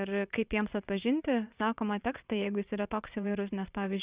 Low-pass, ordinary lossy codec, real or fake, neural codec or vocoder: 3.6 kHz; Opus, 64 kbps; fake; vocoder, 22.05 kHz, 80 mel bands, WaveNeXt